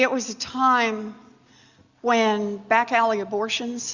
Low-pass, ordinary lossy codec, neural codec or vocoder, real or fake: 7.2 kHz; Opus, 64 kbps; none; real